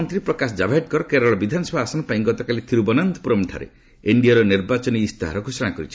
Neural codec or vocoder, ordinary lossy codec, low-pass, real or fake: none; none; none; real